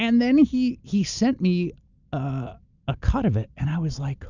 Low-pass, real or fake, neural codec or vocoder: 7.2 kHz; real; none